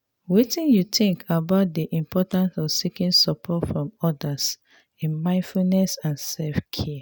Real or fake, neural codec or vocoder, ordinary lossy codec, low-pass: real; none; none; none